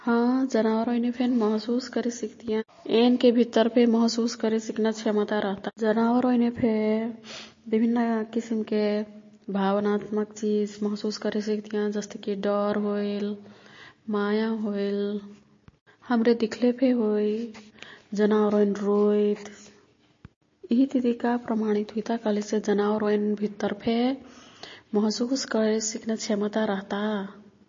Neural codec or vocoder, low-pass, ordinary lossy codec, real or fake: none; 7.2 kHz; MP3, 32 kbps; real